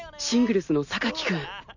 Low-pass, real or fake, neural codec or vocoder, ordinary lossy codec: 7.2 kHz; real; none; none